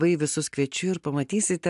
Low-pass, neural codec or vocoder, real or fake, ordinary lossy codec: 10.8 kHz; none; real; AAC, 96 kbps